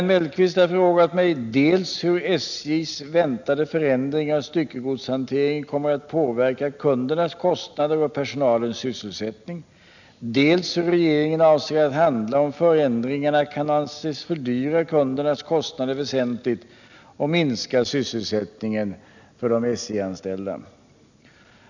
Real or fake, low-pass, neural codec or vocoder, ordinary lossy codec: real; 7.2 kHz; none; none